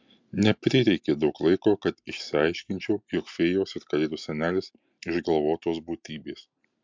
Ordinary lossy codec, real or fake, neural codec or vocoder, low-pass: MP3, 64 kbps; real; none; 7.2 kHz